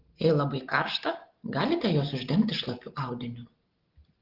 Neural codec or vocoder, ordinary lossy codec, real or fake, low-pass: none; Opus, 16 kbps; real; 5.4 kHz